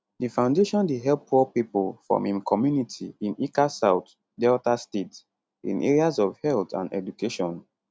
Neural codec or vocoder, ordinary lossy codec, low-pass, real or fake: none; none; none; real